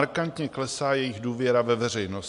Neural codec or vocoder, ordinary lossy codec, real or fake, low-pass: none; MP3, 64 kbps; real; 10.8 kHz